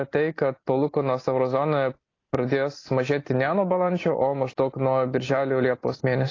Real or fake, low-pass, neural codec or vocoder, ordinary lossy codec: real; 7.2 kHz; none; AAC, 32 kbps